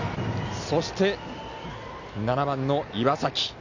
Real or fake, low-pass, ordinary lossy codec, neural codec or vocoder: real; 7.2 kHz; none; none